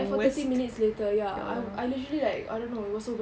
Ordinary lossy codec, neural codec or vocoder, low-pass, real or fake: none; none; none; real